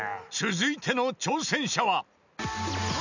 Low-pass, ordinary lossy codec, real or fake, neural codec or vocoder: 7.2 kHz; none; real; none